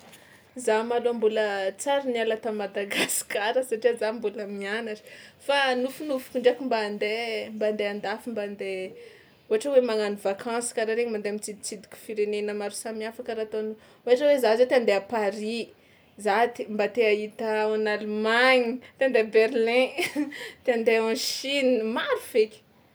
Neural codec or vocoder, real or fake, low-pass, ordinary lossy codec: none; real; none; none